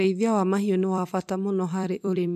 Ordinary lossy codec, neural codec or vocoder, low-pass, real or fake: MP3, 96 kbps; vocoder, 44.1 kHz, 128 mel bands every 256 samples, BigVGAN v2; 14.4 kHz; fake